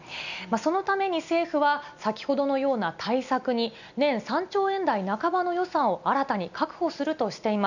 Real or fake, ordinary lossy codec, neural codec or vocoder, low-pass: real; none; none; 7.2 kHz